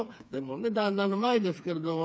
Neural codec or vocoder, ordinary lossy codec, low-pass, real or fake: codec, 16 kHz, 4 kbps, FreqCodec, smaller model; none; none; fake